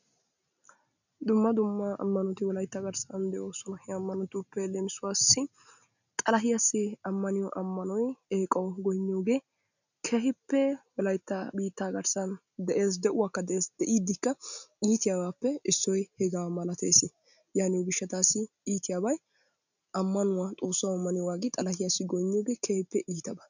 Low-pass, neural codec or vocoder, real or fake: 7.2 kHz; none; real